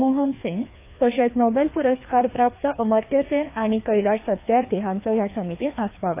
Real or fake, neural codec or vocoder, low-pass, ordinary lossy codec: fake; codec, 16 kHz, 2 kbps, FreqCodec, larger model; 3.6 kHz; AAC, 24 kbps